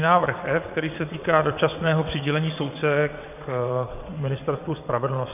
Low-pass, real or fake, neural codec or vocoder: 3.6 kHz; fake; vocoder, 22.05 kHz, 80 mel bands, WaveNeXt